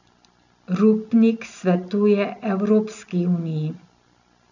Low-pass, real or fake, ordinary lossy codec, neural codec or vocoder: 7.2 kHz; real; none; none